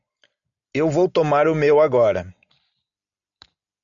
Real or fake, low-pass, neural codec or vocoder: real; 7.2 kHz; none